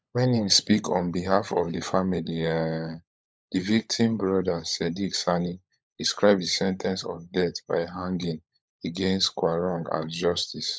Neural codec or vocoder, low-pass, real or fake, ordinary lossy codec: codec, 16 kHz, 16 kbps, FunCodec, trained on LibriTTS, 50 frames a second; none; fake; none